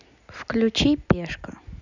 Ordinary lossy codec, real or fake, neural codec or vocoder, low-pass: none; real; none; 7.2 kHz